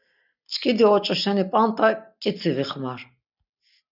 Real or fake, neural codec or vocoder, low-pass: real; none; 5.4 kHz